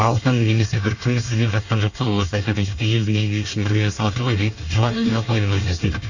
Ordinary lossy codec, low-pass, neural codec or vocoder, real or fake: none; 7.2 kHz; codec, 24 kHz, 1 kbps, SNAC; fake